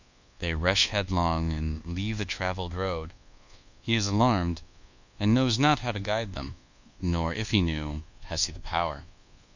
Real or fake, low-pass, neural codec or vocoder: fake; 7.2 kHz; codec, 24 kHz, 1.2 kbps, DualCodec